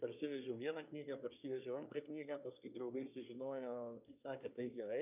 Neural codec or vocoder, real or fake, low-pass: codec, 24 kHz, 1 kbps, SNAC; fake; 3.6 kHz